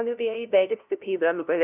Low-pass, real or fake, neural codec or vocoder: 3.6 kHz; fake; codec, 16 kHz, 0.5 kbps, FunCodec, trained on LibriTTS, 25 frames a second